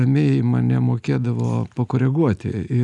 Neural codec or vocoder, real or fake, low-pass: none; real; 10.8 kHz